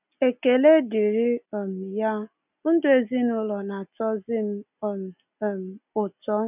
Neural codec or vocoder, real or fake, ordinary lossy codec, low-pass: none; real; none; 3.6 kHz